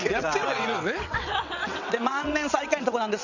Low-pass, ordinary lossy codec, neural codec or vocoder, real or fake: 7.2 kHz; none; vocoder, 22.05 kHz, 80 mel bands, WaveNeXt; fake